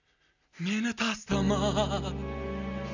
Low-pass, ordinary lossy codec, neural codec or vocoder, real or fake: 7.2 kHz; none; none; real